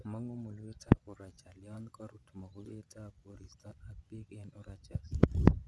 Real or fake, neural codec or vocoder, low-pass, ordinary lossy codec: fake; vocoder, 24 kHz, 100 mel bands, Vocos; none; none